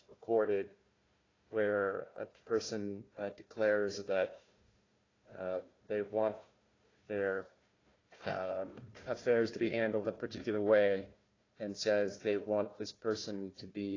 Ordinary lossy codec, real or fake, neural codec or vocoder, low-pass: AAC, 32 kbps; fake; codec, 16 kHz, 1 kbps, FunCodec, trained on Chinese and English, 50 frames a second; 7.2 kHz